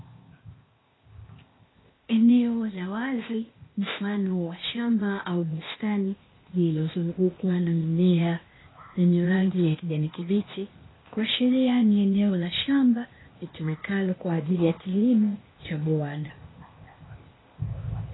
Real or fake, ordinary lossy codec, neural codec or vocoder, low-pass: fake; AAC, 16 kbps; codec, 16 kHz, 0.8 kbps, ZipCodec; 7.2 kHz